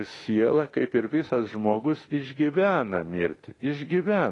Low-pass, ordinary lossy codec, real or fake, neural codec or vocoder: 10.8 kHz; AAC, 32 kbps; fake; autoencoder, 48 kHz, 32 numbers a frame, DAC-VAE, trained on Japanese speech